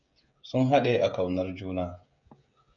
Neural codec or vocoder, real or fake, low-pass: codec, 16 kHz, 16 kbps, FreqCodec, smaller model; fake; 7.2 kHz